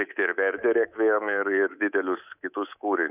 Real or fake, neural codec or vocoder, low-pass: fake; codec, 24 kHz, 3.1 kbps, DualCodec; 3.6 kHz